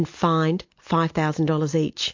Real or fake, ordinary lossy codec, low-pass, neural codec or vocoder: real; MP3, 48 kbps; 7.2 kHz; none